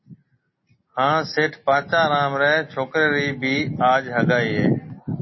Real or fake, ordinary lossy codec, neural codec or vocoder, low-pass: real; MP3, 24 kbps; none; 7.2 kHz